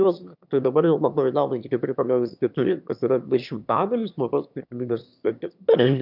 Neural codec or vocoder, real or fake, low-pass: autoencoder, 22.05 kHz, a latent of 192 numbers a frame, VITS, trained on one speaker; fake; 5.4 kHz